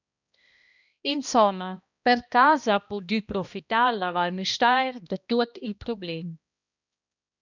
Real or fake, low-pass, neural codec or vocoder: fake; 7.2 kHz; codec, 16 kHz, 1 kbps, X-Codec, HuBERT features, trained on balanced general audio